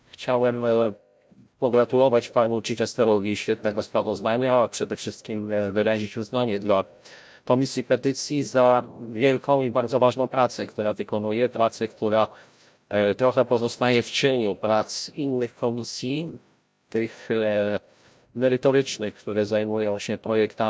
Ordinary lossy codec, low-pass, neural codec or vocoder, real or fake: none; none; codec, 16 kHz, 0.5 kbps, FreqCodec, larger model; fake